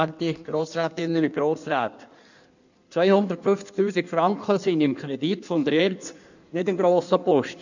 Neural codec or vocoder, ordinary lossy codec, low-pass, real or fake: codec, 16 kHz in and 24 kHz out, 1.1 kbps, FireRedTTS-2 codec; none; 7.2 kHz; fake